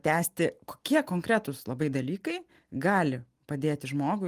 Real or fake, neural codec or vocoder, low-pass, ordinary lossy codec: real; none; 14.4 kHz; Opus, 24 kbps